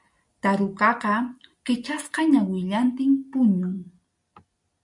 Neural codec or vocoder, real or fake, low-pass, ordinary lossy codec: none; real; 10.8 kHz; MP3, 96 kbps